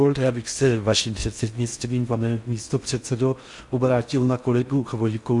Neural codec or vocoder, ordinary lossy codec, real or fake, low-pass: codec, 16 kHz in and 24 kHz out, 0.6 kbps, FocalCodec, streaming, 4096 codes; MP3, 64 kbps; fake; 10.8 kHz